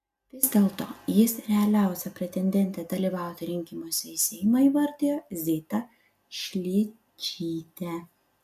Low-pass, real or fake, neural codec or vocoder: 14.4 kHz; real; none